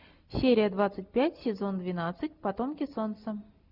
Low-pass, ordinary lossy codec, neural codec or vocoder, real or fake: 5.4 kHz; Opus, 64 kbps; none; real